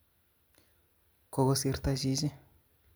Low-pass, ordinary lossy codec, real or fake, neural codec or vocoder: none; none; real; none